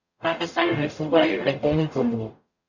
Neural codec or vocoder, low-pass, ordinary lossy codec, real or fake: codec, 44.1 kHz, 0.9 kbps, DAC; 7.2 kHz; Opus, 64 kbps; fake